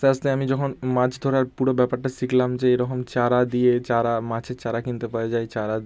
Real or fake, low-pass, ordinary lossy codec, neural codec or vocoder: real; none; none; none